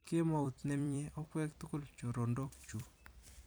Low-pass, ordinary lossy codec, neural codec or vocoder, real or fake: none; none; vocoder, 44.1 kHz, 128 mel bands every 256 samples, BigVGAN v2; fake